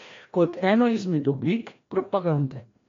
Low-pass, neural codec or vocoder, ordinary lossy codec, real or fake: 7.2 kHz; codec, 16 kHz, 1 kbps, FreqCodec, larger model; MP3, 48 kbps; fake